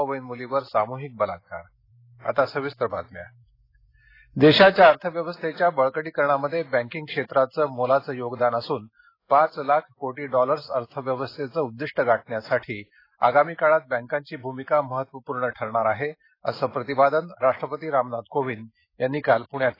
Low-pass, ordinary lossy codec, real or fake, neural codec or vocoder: 5.4 kHz; AAC, 24 kbps; real; none